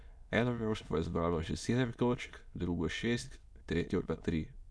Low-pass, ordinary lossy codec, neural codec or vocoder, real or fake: none; none; autoencoder, 22.05 kHz, a latent of 192 numbers a frame, VITS, trained on many speakers; fake